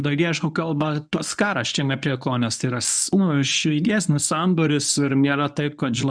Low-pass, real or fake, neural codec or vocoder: 9.9 kHz; fake; codec, 24 kHz, 0.9 kbps, WavTokenizer, medium speech release version 1